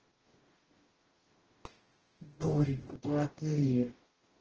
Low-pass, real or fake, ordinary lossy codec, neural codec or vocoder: 7.2 kHz; fake; Opus, 16 kbps; codec, 44.1 kHz, 0.9 kbps, DAC